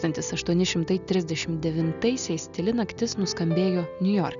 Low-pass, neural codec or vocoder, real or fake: 7.2 kHz; none; real